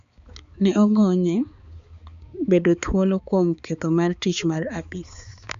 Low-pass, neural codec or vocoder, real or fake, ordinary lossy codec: 7.2 kHz; codec, 16 kHz, 4 kbps, X-Codec, HuBERT features, trained on balanced general audio; fake; Opus, 64 kbps